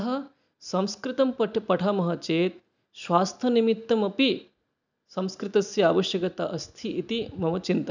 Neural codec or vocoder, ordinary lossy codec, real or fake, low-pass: none; none; real; 7.2 kHz